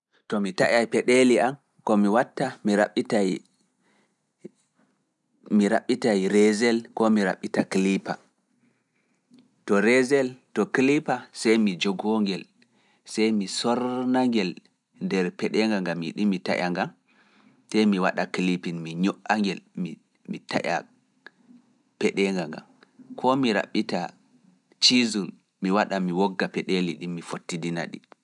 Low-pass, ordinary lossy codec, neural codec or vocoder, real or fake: 10.8 kHz; none; none; real